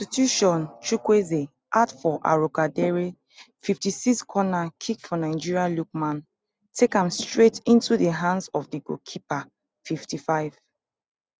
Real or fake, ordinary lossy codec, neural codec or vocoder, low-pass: real; none; none; none